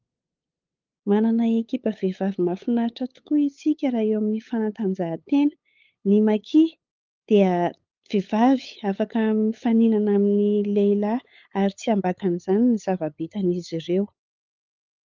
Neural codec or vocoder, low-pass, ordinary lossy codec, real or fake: codec, 16 kHz, 8 kbps, FunCodec, trained on LibriTTS, 25 frames a second; 7.2 kHz; Opus, 32 kbps; fake